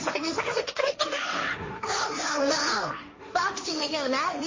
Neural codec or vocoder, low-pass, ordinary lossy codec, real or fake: codec, 16 kHz, 1.1 kbps, Voila-Tokenizer; 7.2 kHz; MP3, 32 kbps; fake